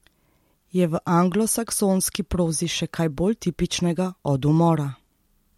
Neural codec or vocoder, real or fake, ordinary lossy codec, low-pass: none; real; MP3, 64 kbps; 19.8 kHz